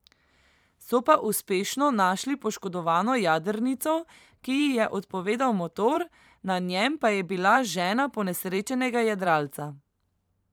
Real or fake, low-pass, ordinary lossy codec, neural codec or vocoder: fake; none; none; vocoder, 44.1 kHz, 128 mel bands every 512 samples, BigVGAN v2